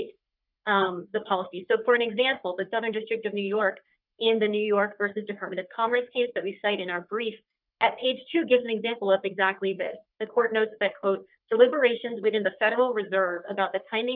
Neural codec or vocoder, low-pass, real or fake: codec, 44.1 kHz, 3.4 kbps, Pupu-Codec; 5.4 kHz; fake